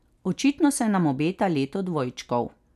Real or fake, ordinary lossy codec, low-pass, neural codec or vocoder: real; none; 14.4 kHz; none